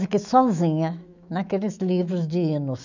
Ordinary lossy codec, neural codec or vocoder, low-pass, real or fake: none; autoencoder, 48 kHz, 128 numbers a frame, DAC-VAE, trained on Japanese speech; 7.2 kHz; fake